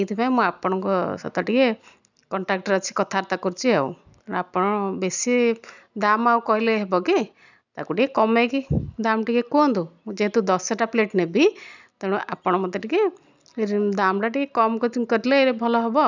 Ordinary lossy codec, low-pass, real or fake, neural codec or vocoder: none; 7.2 kHz; real; none